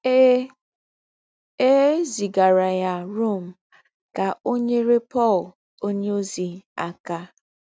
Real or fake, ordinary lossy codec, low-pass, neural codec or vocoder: real; none; none; none